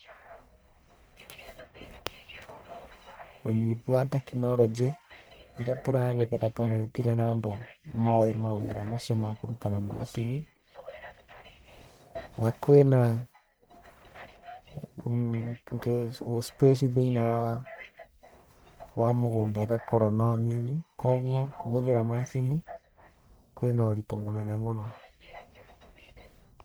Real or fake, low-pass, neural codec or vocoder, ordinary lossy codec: fake; none; codec, 44.1 kHz, 1.7 kbps, Pupu-Codec; none